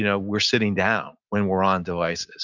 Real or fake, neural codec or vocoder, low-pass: real; none; 7.2 kHz